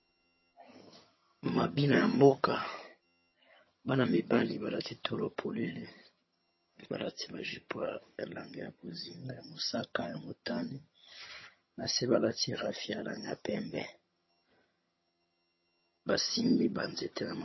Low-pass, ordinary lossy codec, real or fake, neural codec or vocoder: 7.2 kHz; MP3, 24 kbps; fake; vocoder, 22.05 kHz, 80 mel bands, HiFi-GAN